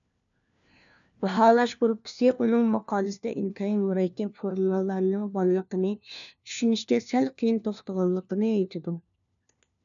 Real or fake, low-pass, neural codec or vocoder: fake; 7.2 kHz; codec, 16 kHz, 1 kbps, FunCodec, trained on LibriTTS, 50 frames a second